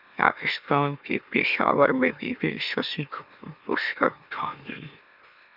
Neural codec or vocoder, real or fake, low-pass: autoencoder, 44.1 kHz, a latent of 192 numbers a frame, MeloTTS; fake; 5.4 kHz